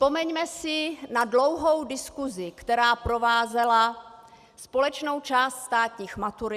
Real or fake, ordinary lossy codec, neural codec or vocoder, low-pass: real; Opus, 64 kbps; none; 14.4 kHz